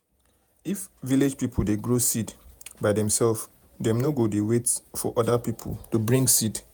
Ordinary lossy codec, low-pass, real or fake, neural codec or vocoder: none; none; fake; vocoder, 48 kHz, 128 mel bands, Vocos